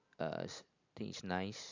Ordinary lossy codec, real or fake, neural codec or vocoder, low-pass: AAC, 48 kbps; real; none; 7.2 kHz